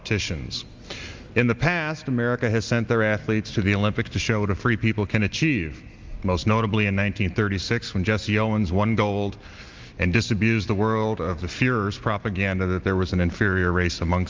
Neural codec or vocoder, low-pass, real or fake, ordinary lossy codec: none; 7.2 kHz; real; Opus, 32 kbps